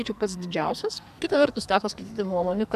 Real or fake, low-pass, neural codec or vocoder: fake; 14.4 kHz; codec, 44.1 kHz, 2.6 kbps, SNAC